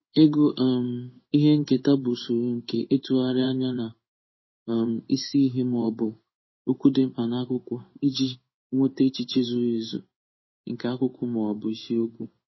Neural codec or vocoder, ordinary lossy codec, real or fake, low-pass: codec, 16 kHz in and 24 kHz out, 1 kbps, XY-Tokenizer; MP3, 24 kbps; fake; 7.2 kHz